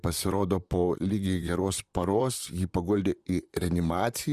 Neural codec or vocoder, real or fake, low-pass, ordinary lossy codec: vocoder, 44.1 kHz, 128 mel bands, Pupu-Vocoder; fake; 14.4 kHz; Opus, 64 kbps